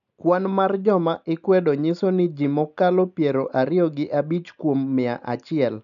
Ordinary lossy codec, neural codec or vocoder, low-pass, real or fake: none; none; 7.2 kHz; real